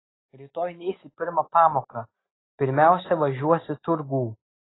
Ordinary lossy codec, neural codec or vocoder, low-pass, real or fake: AAC, 16 kbps; none; 7.2 kHz; real